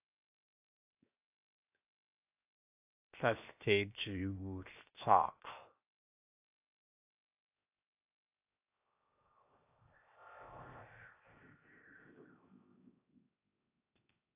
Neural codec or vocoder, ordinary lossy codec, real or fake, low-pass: codec, 16 kHz, 0.7 kbps, FocalCodec; AAC, 32 kbps; fake; 3.6 kHz